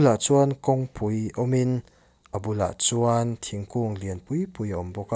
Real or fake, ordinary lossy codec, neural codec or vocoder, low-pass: real; none; none; none